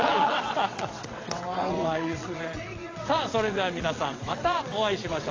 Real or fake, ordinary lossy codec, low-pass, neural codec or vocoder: fake; AAC, 32 kbps; 7.2 kHz; vocoder, 22.05 kHz, 80 mel bands, WaveNeXt